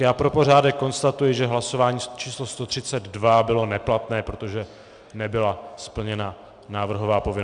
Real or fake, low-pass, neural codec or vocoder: real; 9.9 kHz; none